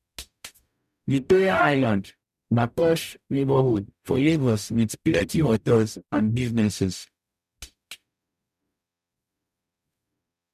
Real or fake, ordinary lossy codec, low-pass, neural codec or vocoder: fake; none; 14.4 kHz; codec, 44.1 kHz, 0.9 kbps, DAC